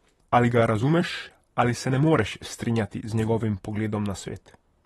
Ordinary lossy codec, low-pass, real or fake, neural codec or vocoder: AAC, 32 kbps; 19.8 kHz; fake; vocoder, 44.1 kHz, 128 mel bands, Pupu-Vocoder